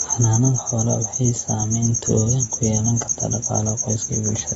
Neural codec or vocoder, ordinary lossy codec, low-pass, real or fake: none; AAC, 24 kbps; 10.8 kHz; real